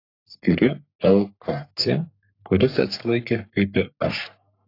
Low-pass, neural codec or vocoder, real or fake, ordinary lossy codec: 5.4 kHz; codec, 44.1 kHz, 3.4 kbps, Pupu-Codec; fake; AAC, 32 kbps